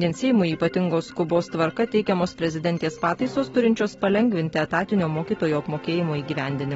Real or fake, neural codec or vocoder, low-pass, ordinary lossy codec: real; none; 19.8 kHz; AAC, 24 kbps